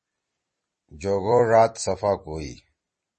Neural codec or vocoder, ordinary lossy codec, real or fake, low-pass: vocoder, 44.1 kHz, 128 mel bands every 256 samples, BigVGAN v2; MP3, 32 kbps; fake; 10.8 kHz